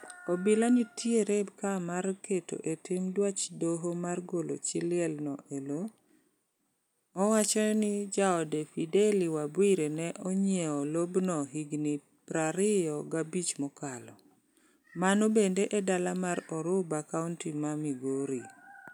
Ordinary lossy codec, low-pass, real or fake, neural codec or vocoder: none; none; real; none